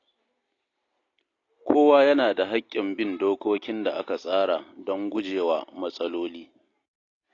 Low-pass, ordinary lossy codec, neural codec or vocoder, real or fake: 7.2 kHz; AAC, 32 kbps; none; real